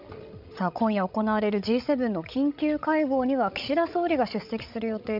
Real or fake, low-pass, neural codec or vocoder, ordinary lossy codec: fake; 5.4 kHz; codec, 16 kHz, 16 kbps, FreqCodec, larger model; none